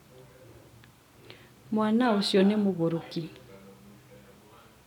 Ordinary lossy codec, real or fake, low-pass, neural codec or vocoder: none; real; 19.8 kHz; none